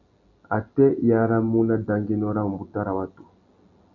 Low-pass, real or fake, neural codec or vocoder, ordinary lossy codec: 7.2 kHz; real; none; MP3, 64 kbps